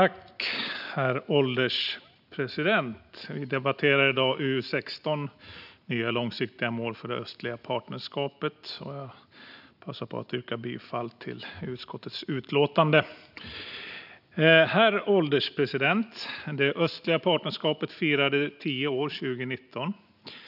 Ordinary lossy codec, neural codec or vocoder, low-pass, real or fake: none; none; 5.4 kHz; real